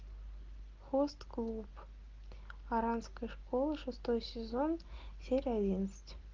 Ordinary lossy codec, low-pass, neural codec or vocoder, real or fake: Opus, 24 kbps; 7.2 kHz; none; real